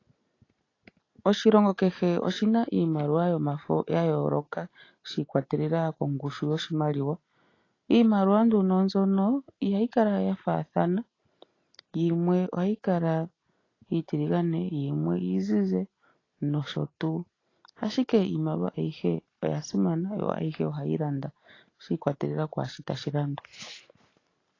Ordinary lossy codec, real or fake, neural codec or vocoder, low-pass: AAC, 32 kbps; real; none; 7.2 kHz